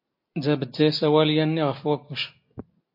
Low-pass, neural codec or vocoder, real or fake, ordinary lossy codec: 5.4 kHz; none; real; MP3, 32 kbps